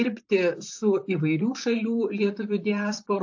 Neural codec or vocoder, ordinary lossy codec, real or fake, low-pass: vocoder, 44.1 kHz, 128 mel bands, Pupu-Vocoder; AAC, 48 kbps; fake; 7.2 kHz